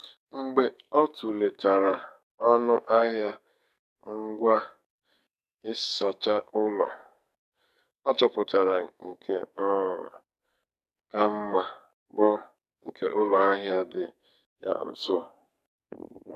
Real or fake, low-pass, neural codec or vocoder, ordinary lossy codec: fake; 14.4 kHz; codec, 44.1 kHz, 2.6 kbps, SNAC; MP3, 96 kbps